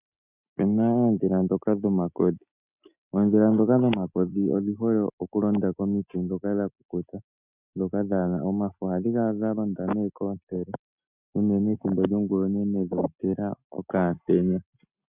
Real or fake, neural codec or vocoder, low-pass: real; none; 3.6 kHz